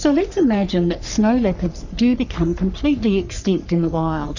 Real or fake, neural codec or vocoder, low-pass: fake; codec, 44.1 kHz, 3.4 kbps, Pupu-Codec; 7.2 kHz